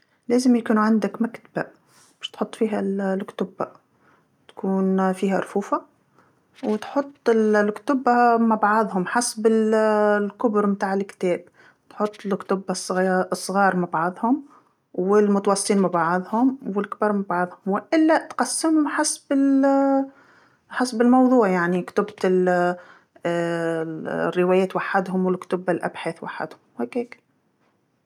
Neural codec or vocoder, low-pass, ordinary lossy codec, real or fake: none; 19.8 kHz; none; real